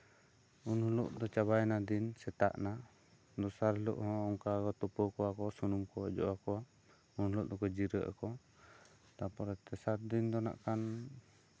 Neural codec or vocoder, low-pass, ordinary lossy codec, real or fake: none; none; none; real